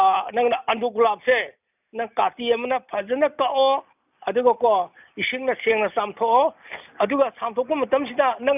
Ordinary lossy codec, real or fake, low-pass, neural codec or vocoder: none; real; 3.6 kHz; none